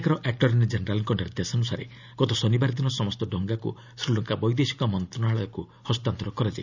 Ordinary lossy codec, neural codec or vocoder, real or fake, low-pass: none; none; real; 7.2 kHz